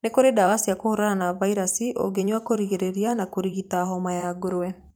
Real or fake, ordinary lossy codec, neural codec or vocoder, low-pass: fake; none; vocoder, 44.1 kHz, 128 mel bands every 512 samples, BigVGAN v2; none